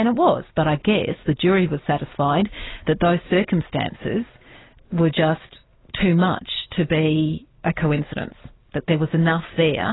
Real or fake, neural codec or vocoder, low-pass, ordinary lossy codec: real; none; 7.2 kHz; AAC, 16 kbps